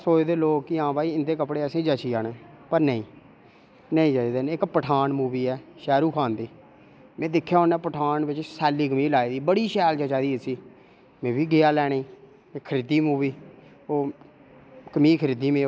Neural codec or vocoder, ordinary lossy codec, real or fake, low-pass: none; none; real; none